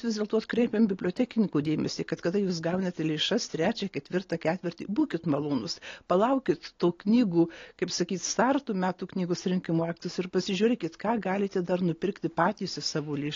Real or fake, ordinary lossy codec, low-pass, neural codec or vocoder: real; AAC, 32 kbps; 7.2 kHz; none